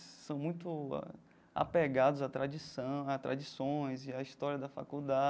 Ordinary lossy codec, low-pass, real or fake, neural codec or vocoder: none; none; real; none